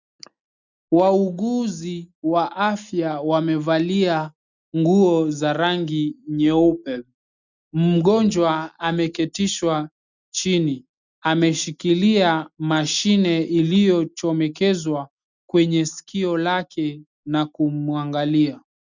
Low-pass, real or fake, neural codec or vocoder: 7.2 kHz; real; none